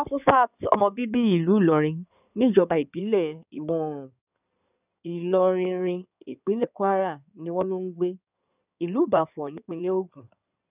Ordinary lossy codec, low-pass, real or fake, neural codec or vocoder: none; 3.6 kHz; fake; codec, 16 kHz in and 24 kHz out, 2.2 kbps, FireRedTTS-2 codec